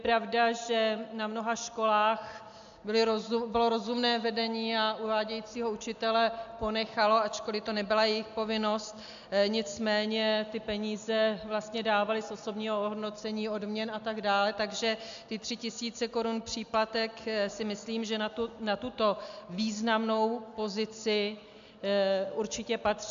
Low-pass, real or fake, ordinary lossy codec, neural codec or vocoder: 7.2 kHz; real; AAC, 64 kbps; none